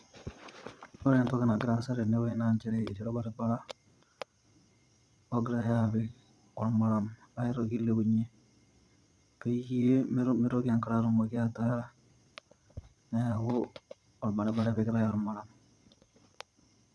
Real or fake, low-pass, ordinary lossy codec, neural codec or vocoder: fake; none; none; vocoder, 22.05 kHz, 80 mel bands, Vocos